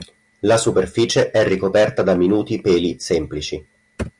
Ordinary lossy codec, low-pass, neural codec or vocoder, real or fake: Opus, 64 kbps; 10.8 kHz; none; real